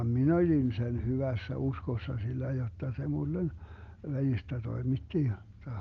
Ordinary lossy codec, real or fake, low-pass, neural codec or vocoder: Opus, 32 kbps; real; 7.2 kHz; none